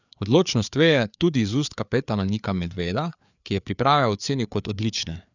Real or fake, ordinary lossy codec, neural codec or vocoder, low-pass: fake; none; codec, 16 kHz, 4 kbps, FreqCodec, larger model; 7.2 kHz